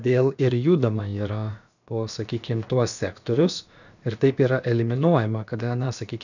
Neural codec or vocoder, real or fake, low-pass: codec, 16 kHz, about 1 kbps, DyCAST, with the encoder's durations; fake; 7.2 kHz